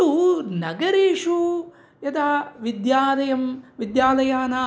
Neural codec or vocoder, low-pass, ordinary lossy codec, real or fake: none; none; none; real